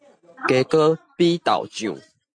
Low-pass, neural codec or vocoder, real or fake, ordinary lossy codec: 9.9 kHz; none; real; MP3, 48 kbps